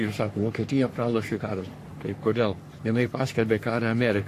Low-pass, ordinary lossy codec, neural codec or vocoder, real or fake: 14.4 kHz; AAC, 64 kbps; codec, 44.1 kHz, 3.4 kbps, Pupu-Codec; fake